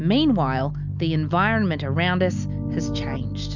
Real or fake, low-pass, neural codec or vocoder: real; 7.2 kHz; none